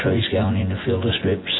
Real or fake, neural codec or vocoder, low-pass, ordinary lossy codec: fake; vocoder, 24 kHz, 100 mel bands, Vocos; 7.2 kHz; AAC, 16 kbps